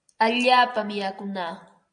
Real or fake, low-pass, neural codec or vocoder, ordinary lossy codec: real; 9.9 kHz; none; AAC, 64 kbps